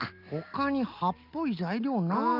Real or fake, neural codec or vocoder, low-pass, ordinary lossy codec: real; none; 5.4 kHz; Opus, 32 kbps